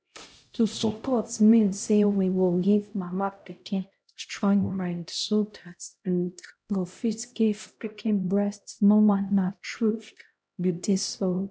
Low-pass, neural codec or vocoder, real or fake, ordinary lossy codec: none; codec, 16 kHz, 0.5 kbps, X-Codec, HuBERT features, trained on LibriSpeech; fake; none